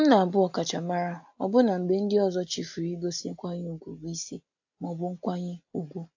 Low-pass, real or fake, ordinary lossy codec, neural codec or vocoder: 7.2 kHz; real; AAC, 48 kbps; none